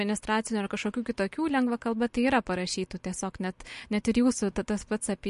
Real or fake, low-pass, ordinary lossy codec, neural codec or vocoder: real; 10.8 kHz; MP3, 48 kbps; none